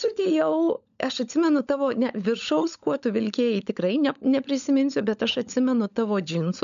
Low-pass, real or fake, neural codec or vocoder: 7.2 kHz; fake; codec, 16 kHz, 16 kbps, FunCodec, trained on LibriTTS, 50 frames a second